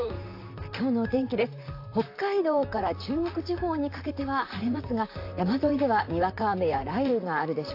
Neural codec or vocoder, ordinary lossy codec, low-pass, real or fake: vocoder, 44.1 kHz, 128 mel bands, Pupu-Vocoder; AAC, 32 kbps; 5.4 kHz; fake